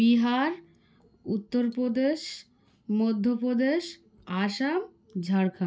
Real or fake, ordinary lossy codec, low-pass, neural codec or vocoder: real; none; none; none